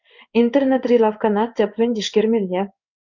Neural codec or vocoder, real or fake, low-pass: codec, 16 kHz in and 24 kHz out, 1 kbps, XY-Tokenizer; fake; 7.2 kHz